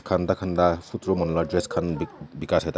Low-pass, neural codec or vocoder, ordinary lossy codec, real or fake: none; none; none; real